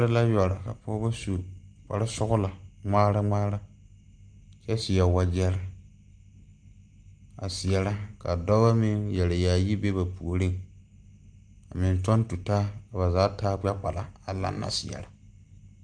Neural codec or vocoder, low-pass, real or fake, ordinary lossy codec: none; 9.9 kHz; real; Opus, 32 kbps